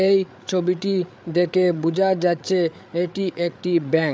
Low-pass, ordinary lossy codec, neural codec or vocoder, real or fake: none; none; codec, 16 kHz, 8 kbps, FreqCodec, larger model; fake